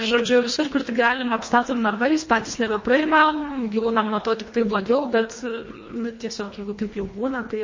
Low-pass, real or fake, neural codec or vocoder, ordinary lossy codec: 7.2 kHz; fake; codec, 24 kHz, 1.5 kbps, HILCodec; MP3, 32 kbps